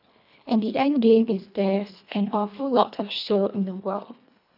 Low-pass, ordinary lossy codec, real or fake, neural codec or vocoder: 5.4 kHz; none; fake; codec, 24 kHz, 1.5 kbps, HILCodec